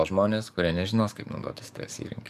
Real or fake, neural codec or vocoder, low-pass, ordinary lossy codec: fake; codec, 44.1 kHz, 7.8 kbps, DAC; 14.4 kHz; MP3, 96 kbps